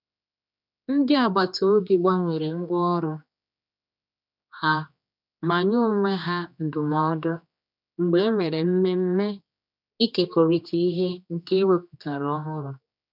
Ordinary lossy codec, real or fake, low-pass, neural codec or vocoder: none; fake; 5.4 kHz; codec, 16 kHz, 2 kbps, X-Codec, HuBERT features, trained on general audio